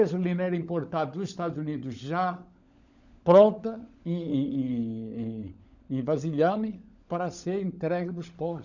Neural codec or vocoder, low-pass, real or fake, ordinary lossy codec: codec, 16 kHz, 16 kbps, FunCodec, trained on LibriTTS, 50 frames a second; 7.2 kHz; fake; none